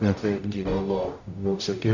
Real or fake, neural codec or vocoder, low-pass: fake; codec, 44.1 kHz, 0.9 kbps, DAC; 7.2 kHz